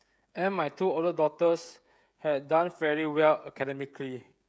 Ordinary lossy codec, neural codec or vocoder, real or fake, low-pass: none; codec, 16 kHz, 16 kbps, FreqCodec, smaller model; fake; none